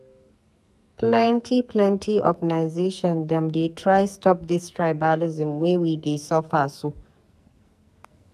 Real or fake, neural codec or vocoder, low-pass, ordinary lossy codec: fake; codec, 44.1 kHz, 2.6 kbps, SNAC; 14.4 kHz; AAC, 96 kbps